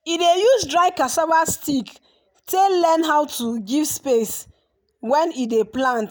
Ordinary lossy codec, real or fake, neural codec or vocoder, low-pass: none; real; none; none